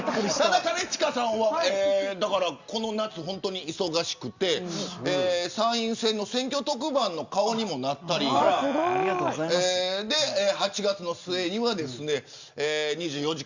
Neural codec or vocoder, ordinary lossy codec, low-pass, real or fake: none; Opus, 64 kbps; 7.2 kHz; real